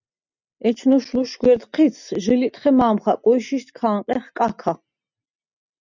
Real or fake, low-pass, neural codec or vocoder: real; 7.2 kHz; none